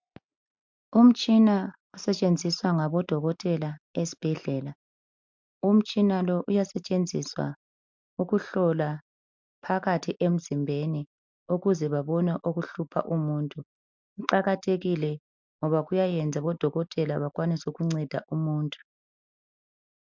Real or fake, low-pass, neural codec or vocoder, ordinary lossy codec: real; 7.2 kHz; none; MP3, 64 kbps